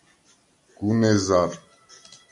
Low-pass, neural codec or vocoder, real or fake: 10.8 kHz; none; real